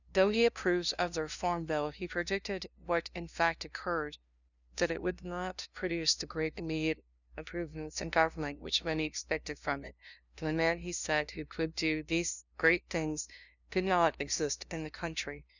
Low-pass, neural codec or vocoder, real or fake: 7.2 kHz; codec, 16 kHz, 0.5 kbps, FunCodec, trained on LibriTTS, 25 frames a second; fake